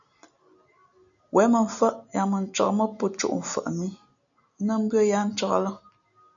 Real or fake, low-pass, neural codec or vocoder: real; 7.2 kHz; none